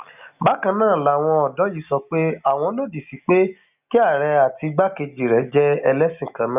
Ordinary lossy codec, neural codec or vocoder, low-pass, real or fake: none; none; 3.6 kHz; real